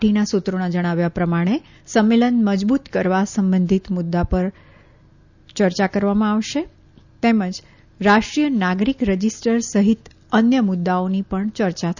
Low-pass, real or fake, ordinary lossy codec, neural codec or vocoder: 7.2 kHz; real; none; none